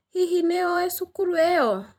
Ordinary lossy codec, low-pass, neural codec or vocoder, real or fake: none; 19.8 kHz; vocoder, 44.1 kHz, 128 mel bands every 256 samples, BigVGAN v2; fake